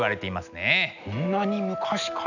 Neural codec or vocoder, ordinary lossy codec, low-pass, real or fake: none; none; 7.2 kHz; real